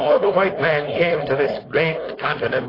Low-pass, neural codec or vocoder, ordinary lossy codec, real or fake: 5.4 kHz; codec, 16 kHz, 4.8 kbps, FACodec; AAC, 24 kbps; fake